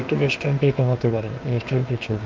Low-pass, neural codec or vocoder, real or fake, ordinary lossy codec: 7.2 kHz; codec, 16 kHz, 0.8 kbps, ZipCodec; fake; Opus, 32 kbps